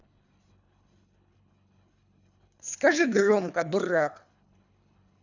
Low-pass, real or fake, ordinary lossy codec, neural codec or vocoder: 7.2 kHz; fake; none; codec, 24 kHz, 3 kbps, HILCodec